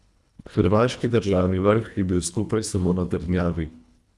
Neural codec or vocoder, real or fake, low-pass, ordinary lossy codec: codec, 24 kHz, 1.5 kbps, HILCodec; fake; none; none